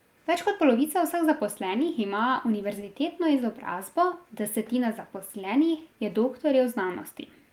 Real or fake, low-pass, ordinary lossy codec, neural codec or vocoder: real; 19.8 kHz; Opus, 32 kbps; none